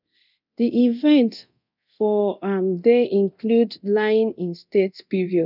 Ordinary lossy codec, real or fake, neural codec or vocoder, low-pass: none; fake; codec, 24 kHz, 0.9 kbps, DualCodec; 5.4 kHz